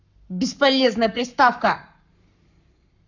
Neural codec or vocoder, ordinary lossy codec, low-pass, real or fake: codec, 44.1 kHz, 7.8 kbps, Pupu-Codec; none; 7.2 kHz; fake